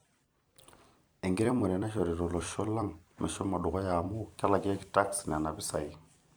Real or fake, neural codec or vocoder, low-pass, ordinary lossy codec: fake; vocoder, 44.1 kHz, 128 mel bands every 256 samples, BigVGAN v2; none; none